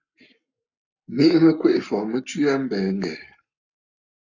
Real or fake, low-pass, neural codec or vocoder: fake; 7.2 kHz; codec, 44.1 kHz, 7.8 kbps, Pupu-Codec